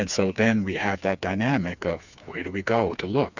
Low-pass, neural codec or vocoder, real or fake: 7.2 kHz; codec, 16 kHz, 4 kbps, FreqCodec, smaller model; fake